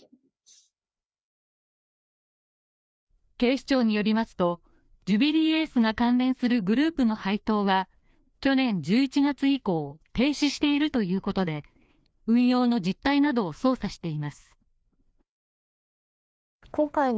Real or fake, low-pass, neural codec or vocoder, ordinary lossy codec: fake; none; codec, 16 kHz, 2 kbps, FreqCodec, larger model; none